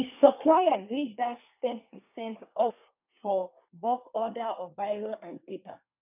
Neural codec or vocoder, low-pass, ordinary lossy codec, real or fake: codec, 24 kHz, 1 kbps, SNAC; 3.6 kHz; none; fake